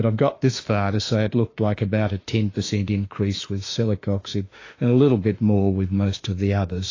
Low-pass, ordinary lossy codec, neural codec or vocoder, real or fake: 7.2 kHz; AAC, 32 kbps; autoencoder, 48 kHz, 32 numbers a frame, DAC-VAE, trained on Japanese speech; fake